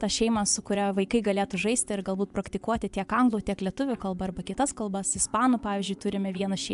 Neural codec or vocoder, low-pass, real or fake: vocoder, 24 kHz, 100 mel bands, Vocos; 10.8 kHz; fake